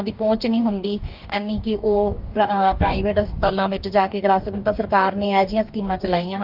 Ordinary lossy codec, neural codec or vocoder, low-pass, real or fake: Opus, 24 kbps; codec, 44.1 kHz, 2.6 kbps, DAC; 5.4 kHz; fake